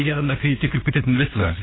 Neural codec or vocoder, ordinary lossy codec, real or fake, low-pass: codec, 16 kHz, 2 kbps, FunCodec, trained on LibriTTS, 25 frames a second; AAC, 16 kbps; fake; 7.2 kHz